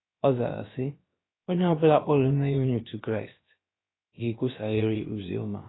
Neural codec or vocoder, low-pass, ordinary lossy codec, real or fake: codec, 16 kHz, about 1 kbps, DyCAST, with the encoder's durations; 7.2 kHz; AAC, 16 kbps; fake